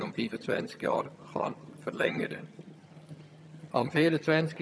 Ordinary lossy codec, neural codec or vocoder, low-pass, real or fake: none; vocoder, 22.05 kHz, 80 mel bands, HiFi-GAN; none; fake